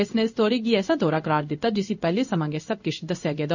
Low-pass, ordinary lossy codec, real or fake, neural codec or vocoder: 7.2 kHz; none; fake; codec, 16 kHz in and 24 kHz out, 1 kbps, XY-Tokenizer